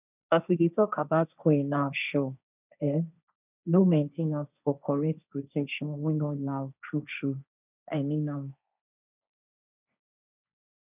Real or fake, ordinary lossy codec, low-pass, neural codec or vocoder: fake; none; 3.6 kHz; codec, 16 kHz, 1.1 kbps, Voila-Tokenizer